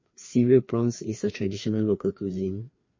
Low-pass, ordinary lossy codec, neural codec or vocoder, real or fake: 7.2 kHz; MP3, 32 kbps; codec, 16 kHz, 2 kbps, FreqCodec, larger model; fake